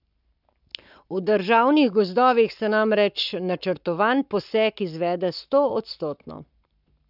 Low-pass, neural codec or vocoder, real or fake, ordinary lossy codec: 5.4 kHz; codec, 44.1 kHz, 7.8 kbps, Pupu-Codec; fake; none